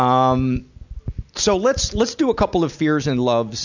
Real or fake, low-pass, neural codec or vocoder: real; 7.2 kHz; none